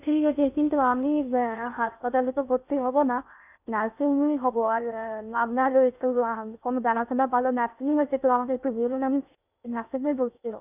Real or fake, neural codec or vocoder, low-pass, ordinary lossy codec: fake; codec, 16 kHz in and 24 kHz out, 0.6 kbps, FocalCodec, streaming, 2048 codes; 3.6 kHz; none